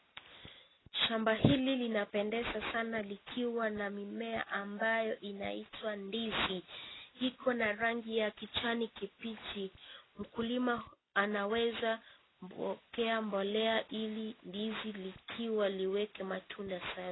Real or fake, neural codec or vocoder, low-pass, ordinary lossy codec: real; none; 7.2 kHz; AAC, 16 kbps